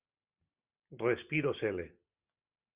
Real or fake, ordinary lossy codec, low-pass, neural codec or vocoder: real; Opus, 64 kbps; 3.6 kHz; none